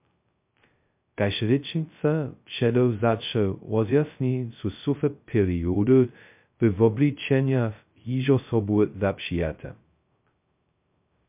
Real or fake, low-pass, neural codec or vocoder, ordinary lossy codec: fake; 3.6 kHz; codec, 16 kHz, 0.2 kbps, FocalCodec; MP3, 32 kbps